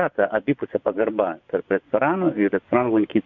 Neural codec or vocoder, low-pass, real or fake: vocoder, 24 kHz, 100 mel bands, Vocos; 7.2 kHz; fake